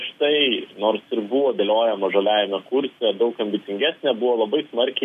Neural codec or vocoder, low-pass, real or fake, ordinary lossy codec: none; 14.4 kHz; real; MP3, 64 kbps